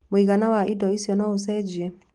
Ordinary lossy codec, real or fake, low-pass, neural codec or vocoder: Opus, 24 kbps; real; 10.8 kHz; none